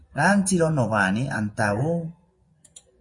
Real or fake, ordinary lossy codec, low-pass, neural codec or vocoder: fake; MP3, 48 kbps; 10.8 kHz; vocoder, 24 kHz, 100 mel bands, Vocos